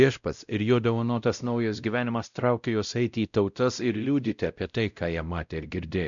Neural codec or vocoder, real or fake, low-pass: codec, 16 kHz, 0.5 kbps, X-Codec, WavLM features, trained on Multilingual LibriSpeech; fake; 7.2 kHz